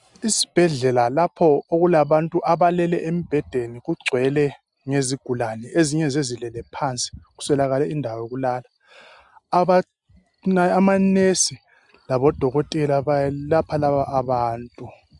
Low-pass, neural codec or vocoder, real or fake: 10.8 kHz; none; real